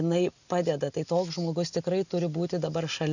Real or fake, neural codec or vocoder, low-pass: real; none; 7.2 kHz